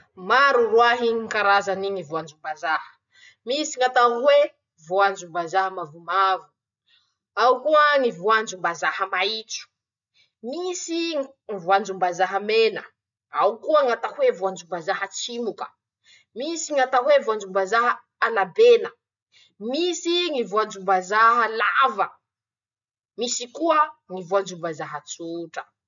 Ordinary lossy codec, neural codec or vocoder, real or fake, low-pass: none; none; real; 7.2 kHz